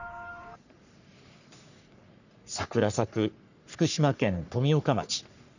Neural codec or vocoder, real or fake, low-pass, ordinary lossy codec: codec, 44.1 kHz, 3.4 kbps, Pupu-Codec; fake; 7.2 kHz; none